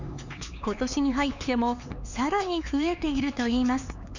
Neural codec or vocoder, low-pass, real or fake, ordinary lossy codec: codec, 16 kHz, 4 kbps, X-Codec, HuBERT features, trained on LibriSpeech; 7.2 kHz; fake; none